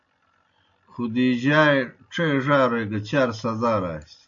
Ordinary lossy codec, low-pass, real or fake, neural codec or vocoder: AAC, 64 kbps; 7.2 kHz; real; none